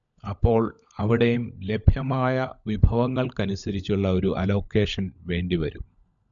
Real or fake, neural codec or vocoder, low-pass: fake; codec, 16 kHz, 16 kbps, FunCodec, trained on LibriTTS, 50 frames a second; 7.2 kHz